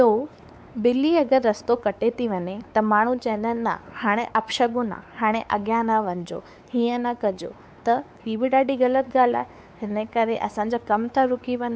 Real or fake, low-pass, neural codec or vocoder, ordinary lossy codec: fake; none; codec, 16 kHz, 4 kbps, X-Codec, WavLM features, trained on Multilingual LibriSpeech; none